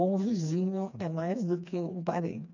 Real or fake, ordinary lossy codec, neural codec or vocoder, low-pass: fake; none; codec, 16 kHz, 2 kbps, FreqCodec, smaller model; 7.2 kHz